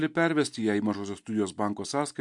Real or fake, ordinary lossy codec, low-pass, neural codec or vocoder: real; MP3, 64 kbps; 10.8 kHz; none